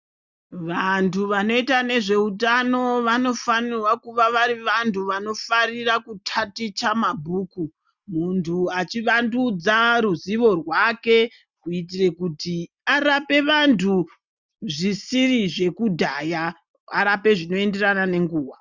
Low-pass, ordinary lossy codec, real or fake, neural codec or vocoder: 7.2 kHz; Opus, 64 kbps; real; none